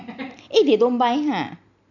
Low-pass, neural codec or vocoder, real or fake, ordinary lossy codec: 7.2 kHz; none; real; none